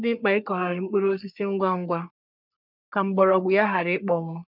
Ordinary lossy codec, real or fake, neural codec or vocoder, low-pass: none; fake; codec, 44.1 kHz, 3.4 kbps, Pupu-Codec; 5.4 kHz